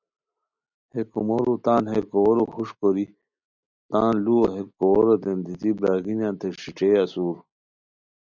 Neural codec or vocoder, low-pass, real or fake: none; 7.2 kHz; real